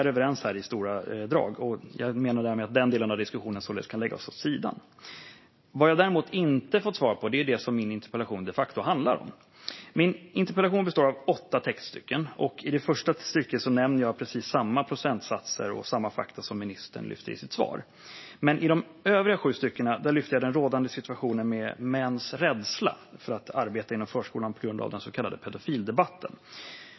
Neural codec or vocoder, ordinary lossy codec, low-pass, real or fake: none; MP3, 24 kbps; 7.2 kHz; real